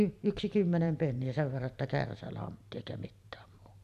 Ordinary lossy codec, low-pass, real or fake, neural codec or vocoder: none; 14.4 kHz; fake; vocoder, 44.1 kHz, 128 mel bands every 512 samples, BigVGAN v2